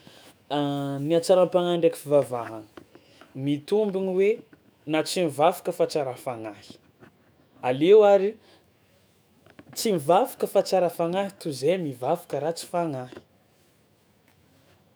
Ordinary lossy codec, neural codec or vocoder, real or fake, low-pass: none; autoencoder, 48 kHz, 128 numbers a frame, DAC-VAE, trained on Japanese speech; fake; none